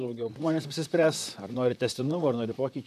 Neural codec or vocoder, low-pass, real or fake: vocoder, 44.1 kHz, 128 mel bands, Pupu-Vocoder; 14.4 kHz; fake